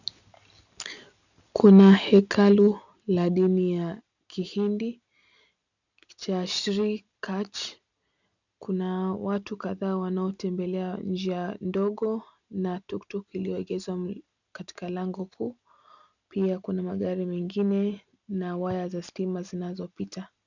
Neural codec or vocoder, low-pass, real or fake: none; 7.2 kHz; real